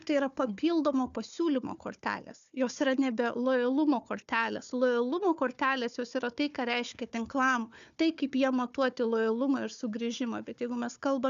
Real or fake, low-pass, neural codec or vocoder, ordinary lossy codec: fake; 7.2 kHz; codec, 16 kHz, 4 kbps, FunCodec, trained on Chinese and English, 50 frames a second; AAC, 96 kbps